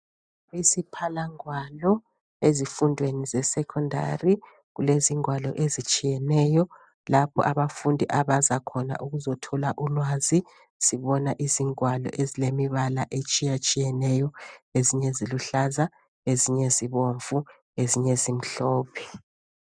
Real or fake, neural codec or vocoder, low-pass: real; none; 9.9 kHz